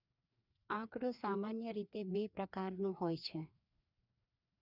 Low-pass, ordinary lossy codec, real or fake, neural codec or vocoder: 5.4 kHz; none; fake; codec, 16 kHz, 2 kbps, FreqCodec, larger model